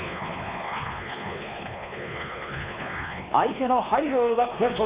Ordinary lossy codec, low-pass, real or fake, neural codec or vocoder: Opus, 64 kbps; 3.6 kHz; fake; codec, 16 kHz, 2 kbps, X-Codec, WavLM features, trained on Multilingual LibriSpeech